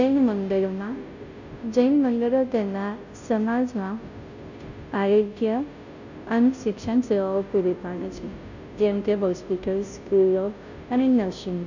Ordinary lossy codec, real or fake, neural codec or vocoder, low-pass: none; fake; codec, 16 kHz, 0.5 kbps, FunCodec, trained on Chinese and English, 25 frames a second; 7.2 kHz